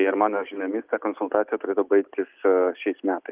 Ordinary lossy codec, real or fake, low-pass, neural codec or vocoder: Opus, 32 kbps; real; 3.6 kHz; none